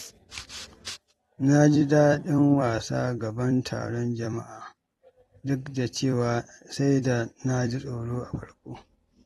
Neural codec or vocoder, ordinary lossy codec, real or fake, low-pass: vocoder, 44.1 kHz, 128 mel bands every 256 samples, BigVGAN v2; AAC, 32 kbps; fake; 19.8 kHz